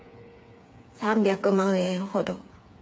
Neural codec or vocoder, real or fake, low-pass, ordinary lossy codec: codec, 16 kHz, 8 kbps, FreqCodec, smaller model; fake; none; none